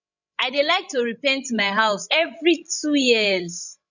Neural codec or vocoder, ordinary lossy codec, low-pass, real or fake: codec, 16 kHz, 16 kbps, FreqCodec, larger model; none; 7.2 kHz; fake